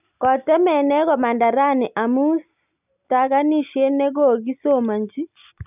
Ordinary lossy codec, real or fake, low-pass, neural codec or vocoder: none; real; 3.6 kHz; none